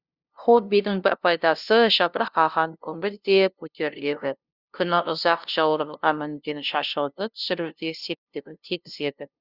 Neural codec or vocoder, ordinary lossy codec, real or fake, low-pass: codec, 16 kHz, 0.5 kbps, FunCodec, trained on LibriTTS, 25 frames a second; Opus, 64 kbps; fake; 5.4 kHz